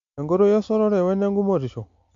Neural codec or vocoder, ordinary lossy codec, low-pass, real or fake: none; MP3, 48 kbps; 7.2 kHz; real